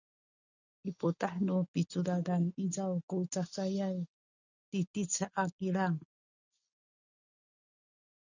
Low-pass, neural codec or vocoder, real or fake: 7.2 kHz; none; real